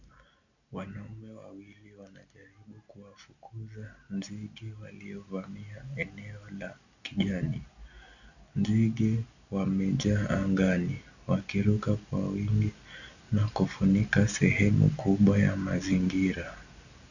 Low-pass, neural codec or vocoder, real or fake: 7.2 kHz; none; real